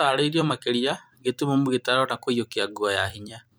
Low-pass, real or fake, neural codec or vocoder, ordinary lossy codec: none; fake; vocoder, 44.1 kHz, 128 mel bands every 256 samples, BigVGAN v2; none